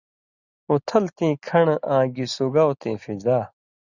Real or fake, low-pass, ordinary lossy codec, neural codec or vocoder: real; 7.2 kHz; Opus, 64 kbps; none